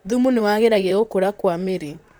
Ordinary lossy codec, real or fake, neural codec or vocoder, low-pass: none; fake; vocoder, 44.1 kHz, 128 mel bands, Pupu-Vocoder; none